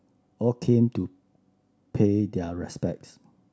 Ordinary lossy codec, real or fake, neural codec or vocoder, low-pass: none; real; none; none